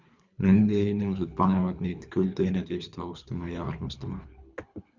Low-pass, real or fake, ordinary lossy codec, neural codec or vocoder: 7.2 kHz; fake; Opus, 64 kbps; codec, 24 kHz, 3 kbps, HILCodec